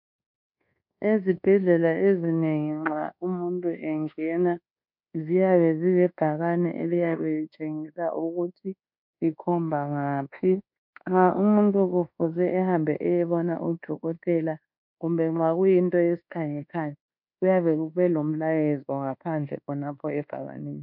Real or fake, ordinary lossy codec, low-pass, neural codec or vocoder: fake; MP3, 48 kbps; 5.4 kHz; codec, 24 kHz, 1.2 kbps, DualCodec